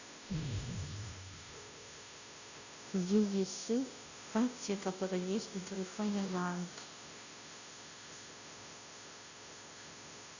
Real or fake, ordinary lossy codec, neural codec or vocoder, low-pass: fake; none; codec, 16 kHz, 0.5 kbps, FunCodec, trained on Chinese and English, 25 frames a second; 7.2 kHz